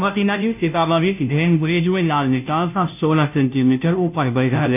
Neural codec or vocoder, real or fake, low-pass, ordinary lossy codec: codec, 16 kHz, 0.5 kbps, FunCodec, trained on Chinese and English, 25 frames a second; fake; 3.6 kHz; none